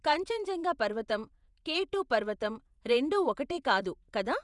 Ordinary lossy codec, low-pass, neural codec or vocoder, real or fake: none; 10.8 kHz; vocoder, 48 kHz, 128 mel bands, Vocos; fake